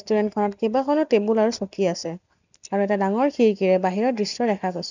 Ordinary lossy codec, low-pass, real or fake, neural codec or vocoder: none; 7.2 kHz; real; none